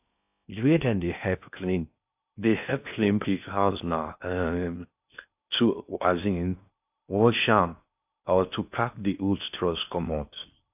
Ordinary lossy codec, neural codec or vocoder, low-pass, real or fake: AAC, 32 kbps; codec, 16 kHz in and 24 kHz out, 0.6 kbps, FocalCodec, streaming, 4096 codes; 3.6 kHz; fake